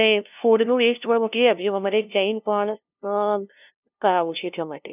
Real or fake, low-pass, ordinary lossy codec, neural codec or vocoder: fake; 3.6 kHz; none; codec, 16 kHz, 0.5 kbps, FunCodec, trained on LibriTTS, 25 frames a second